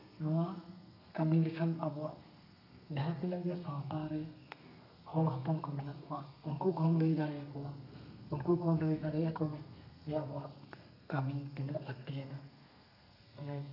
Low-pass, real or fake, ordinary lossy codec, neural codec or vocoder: 5.4 kHz; fake; none; codec, 32 kHz, 1.9 kbps, SNAC